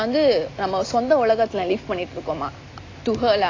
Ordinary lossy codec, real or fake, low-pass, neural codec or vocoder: AAC, 32 kbps; real; 7.2 kHz; none